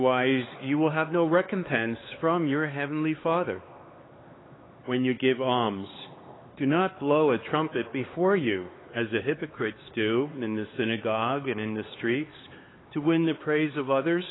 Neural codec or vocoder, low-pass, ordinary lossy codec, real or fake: codec, 16 kHz, 4 kbps, X-Codec, HuBERT features, trained on LibriSpeech; 7.2 kHz; AAC, 16 kbps; fake